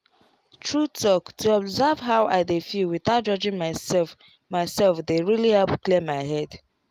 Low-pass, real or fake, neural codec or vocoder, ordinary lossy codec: 14.4 kHz; real; none; Opus, 32 kbps